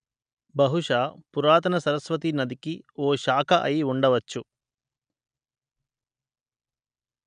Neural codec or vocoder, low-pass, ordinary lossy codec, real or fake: none; 9.9 kHz; none; real